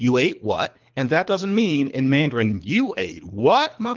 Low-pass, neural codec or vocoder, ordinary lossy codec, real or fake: 7.2 kHz; codec, 24 kHz, 3 kbps, HILCodec; Opus, 32 kbps; fake